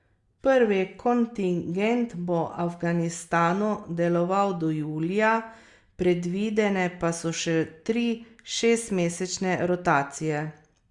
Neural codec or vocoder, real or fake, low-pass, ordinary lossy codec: none; real; 10.8 kHz; Opus, 64 kbps